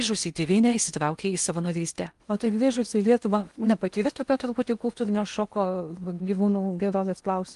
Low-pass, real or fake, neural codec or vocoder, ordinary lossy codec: 10.8 kHz; fake; codec, 16 kHz in and 24 kHz out, 0.8 kbps, FocalCodec, streaming, 65536 codes; Opus, 32 kbps